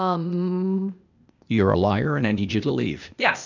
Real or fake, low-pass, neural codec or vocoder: fake; 7.2 kHz; codec, 16 kHz, 0.8 kbps, ZipCodec